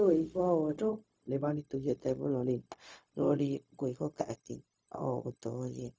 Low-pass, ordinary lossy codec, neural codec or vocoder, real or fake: none; none; codec, 16 kHz, 0.4 kbps, LongCat-Audio-Codec; fake